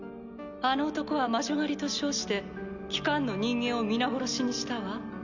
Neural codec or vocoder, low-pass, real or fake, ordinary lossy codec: none; 7.2 kHz; real; none